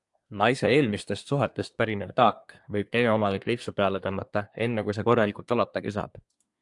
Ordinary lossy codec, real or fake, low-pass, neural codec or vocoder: AAC, 64 kbps; fake; 10.8 kHz; codec, 24 kHz, 1 kbps, SNAC